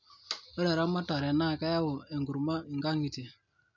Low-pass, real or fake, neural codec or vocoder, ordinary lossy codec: 7.2 kHz; real; none; none